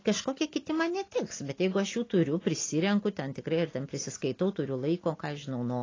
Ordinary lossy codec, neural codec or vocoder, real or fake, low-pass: AAC, 32 kbps; none; real; 7.2 kHz